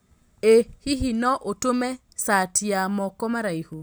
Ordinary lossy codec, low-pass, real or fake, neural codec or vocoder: none; none; real; none